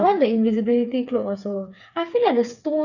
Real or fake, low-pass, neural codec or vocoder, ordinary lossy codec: fake; 7.2 kHz; codec, 16 kHz, 4 kbps, FreqCodec, smaller model; none